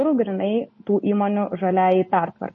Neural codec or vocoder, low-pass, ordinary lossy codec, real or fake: none; 7.2 kHz; MP3, 32 kbps; real